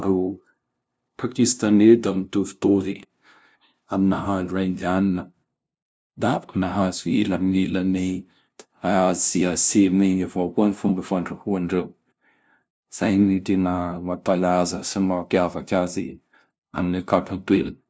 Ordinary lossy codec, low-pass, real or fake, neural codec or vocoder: none; none; fake; codec, 16 kHz, 0.5 kbps, FunCodec, trained on LibriTTS, 25 frames a second